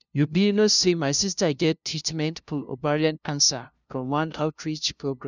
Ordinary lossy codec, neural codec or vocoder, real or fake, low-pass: none; codec, 16 kHz, 0.5 kbps, FunCodec, trained on LibriTTS, 25 frames a second; fake; 7.2 kHz